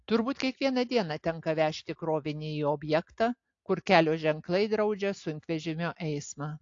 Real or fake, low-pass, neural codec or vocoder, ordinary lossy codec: real; 7.2 kHz; none; AAC, 48 kbps